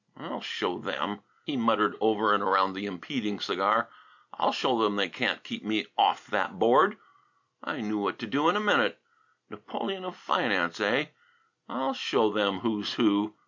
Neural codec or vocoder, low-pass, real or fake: none; 7.2 kHz; real